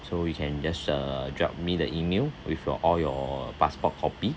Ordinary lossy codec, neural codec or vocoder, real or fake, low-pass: none; none; real; none